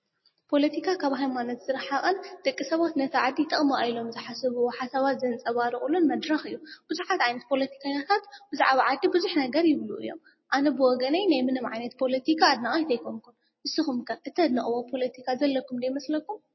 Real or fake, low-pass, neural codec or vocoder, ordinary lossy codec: real; 7.2 kHz; none; MP3, 24 kbps